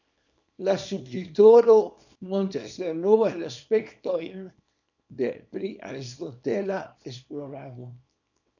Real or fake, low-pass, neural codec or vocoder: fake; 7.2 kHz; codec, 24 kHz, 0.9 kbps, WavTokenizer, small release